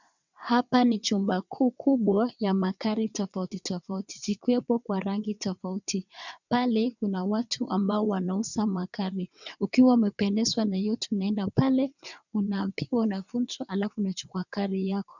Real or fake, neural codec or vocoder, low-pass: fake; vocoder, 22.05 kHz, 80 mel bands, WaveNeXt; 7.2 kHz